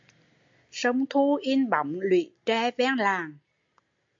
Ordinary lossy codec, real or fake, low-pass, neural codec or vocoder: MP3, 48 kbps; real; 7.2 kHz; none